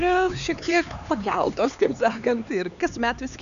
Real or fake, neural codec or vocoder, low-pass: fake; codec, 16 kHz, 4 kbps, X-Codec, HuBERT features, trained on LibriSpeech; 7.2 kHz